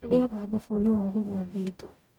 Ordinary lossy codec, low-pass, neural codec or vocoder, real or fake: none; 19.8 kHz; codec, 44.1 kHz, 0.9 kbps, DAC; fake